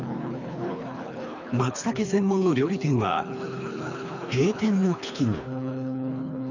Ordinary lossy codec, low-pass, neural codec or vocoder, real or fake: none; 7.2 kHz; codec, 24 kHz, 3 kbps, HILCodec; fake